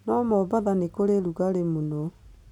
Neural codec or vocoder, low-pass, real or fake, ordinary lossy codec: none; 19.8 kHz; real; none